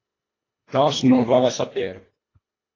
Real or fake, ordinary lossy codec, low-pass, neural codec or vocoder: fake; AAC, 32 kbps; 7.2 kHz; codec, 24 kHz, 1.5 kbps, HILCodec